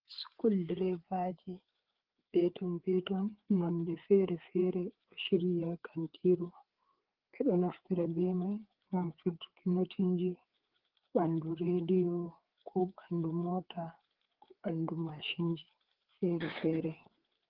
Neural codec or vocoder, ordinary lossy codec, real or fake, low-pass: codec, 16 kHz, 4 kbps, FreqCodec, larger model; Opus, 16 kbps; fake; 5.4 kHz